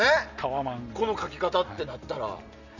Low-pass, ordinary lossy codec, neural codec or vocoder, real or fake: 7.2 kHz; none; none; real